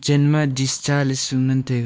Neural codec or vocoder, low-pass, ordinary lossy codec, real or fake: codec, 16 kHz, 2 kbps, X-Codec, WavLM features, trained on Multilingual LibriSpeech; none; none; fake